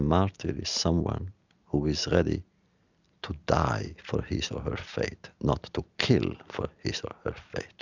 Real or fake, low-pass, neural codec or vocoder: real; 7.2 kHz; none